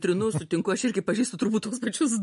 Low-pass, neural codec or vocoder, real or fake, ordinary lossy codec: 14.4 kHz; none; real; MP3, 48 kbps